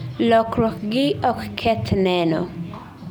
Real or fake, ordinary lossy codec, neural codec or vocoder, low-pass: real; none; none; none